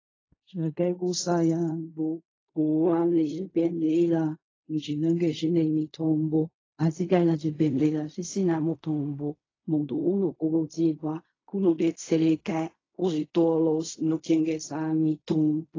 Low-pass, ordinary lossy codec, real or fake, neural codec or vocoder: 7.2 kHz; AAC, 32 kbps; fake; codec, 16 kHz in and 24 kHz out, 0.4 kbps, LongCat-Audio-Codec, fine tuned four codebook decoder